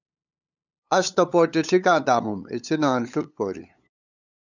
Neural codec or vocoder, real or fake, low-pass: codec, 16 kHz, 8 kbps, FunCodec, trained on LibriTTS, 25 frames a second; fake; 7.2 kHz